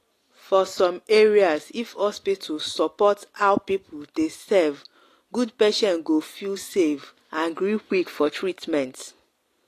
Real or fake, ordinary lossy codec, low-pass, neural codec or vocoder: real; AAC, 48 kbps; 14.4 kHz; none